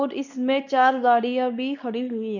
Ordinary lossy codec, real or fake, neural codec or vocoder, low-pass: none; fake; codec, 24 kHz, 0.9 kbps, WavTokenizer, medium speech release version 2; 7.2 kHz